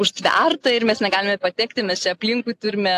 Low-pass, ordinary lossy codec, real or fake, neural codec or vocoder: 14.4 kHz; AAC, 64 kbps; real; none